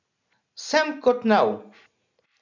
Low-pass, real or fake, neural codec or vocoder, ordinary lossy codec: 7.2 kHz; real; none; none